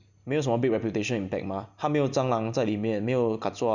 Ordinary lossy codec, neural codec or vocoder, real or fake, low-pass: none; none; real; 7.2 kHz